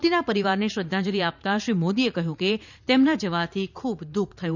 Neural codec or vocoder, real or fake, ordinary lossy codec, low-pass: vocoder, 44.1 kHz, 80 mel bands, Vocos; fake; none; 7.2 kHz